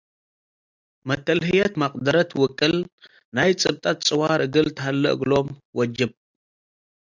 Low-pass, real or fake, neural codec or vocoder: 7.2 kHz; real; none